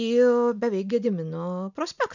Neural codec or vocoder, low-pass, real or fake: none; 7.2 kHz; real